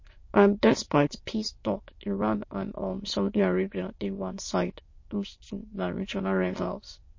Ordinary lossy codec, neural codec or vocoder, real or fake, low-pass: MP3, 32 kbps; autoencoder, 22.05 kHz, a latent of 192 numbers a frame, VITS, trained on many speakers; fake; 7.2 kHz